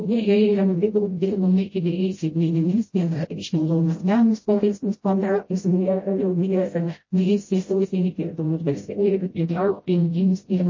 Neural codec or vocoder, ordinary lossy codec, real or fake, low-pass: codec, 16 kHz, 0.5 kbps, FreqCodec, smaller model; MP3, 32 kbps; fake; 7.2 kHz